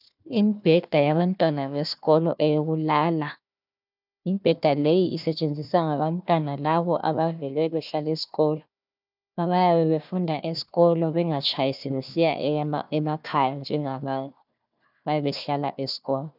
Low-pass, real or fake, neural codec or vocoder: 5.4 kHz; fake; codec, 16 kHz, 1 kbps, FunCodec, trained on Chinese and English, 50 frames a second